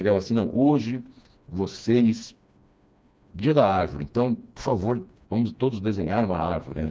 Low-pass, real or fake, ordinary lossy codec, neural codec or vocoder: none; fake; none; codec, 16 kHz, 2 kbps, FreqCodec, smaller model